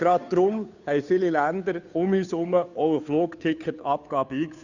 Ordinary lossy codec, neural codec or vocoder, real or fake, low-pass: none; codec, 16 kHz, 2 kbps, FunCodec, trained on Chinese and English, 25 frames a second; fake; 7.2 kHz